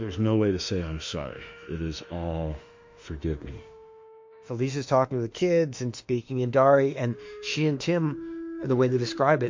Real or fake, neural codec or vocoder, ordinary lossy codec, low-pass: fake; autoencoder, 48 kHz, 32 numbers a frame, DAC-VAE, trained on Japanese speech; MP3, 48 kbps; 7.2 kHz